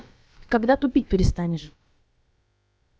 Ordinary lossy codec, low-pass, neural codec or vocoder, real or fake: none; none; codec, 16 kHz, about 1 kbps, DyCAST, with the encoder's durations; fake